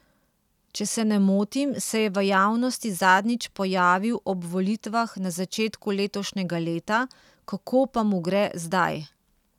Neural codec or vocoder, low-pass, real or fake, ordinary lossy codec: none; 19.8 kHz; real; none